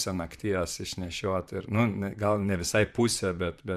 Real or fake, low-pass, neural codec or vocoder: fake; 14.4 kHz; vocoder, 44.1 kHz, 128 mel bands, Pupu-Vocoder